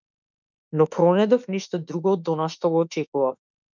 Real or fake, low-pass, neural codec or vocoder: fake; 7.2 kHz; autoencoder, 48 kHz, 32 numbers a frame, DAC-VAE, trained on Japanese speech